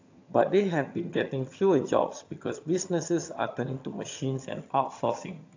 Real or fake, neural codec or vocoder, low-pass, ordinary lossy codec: fake; vocoder, 22.05 kHz, 80 mel bands, HiFi-GAN; 7.2 kHz; AAC, 48 kbps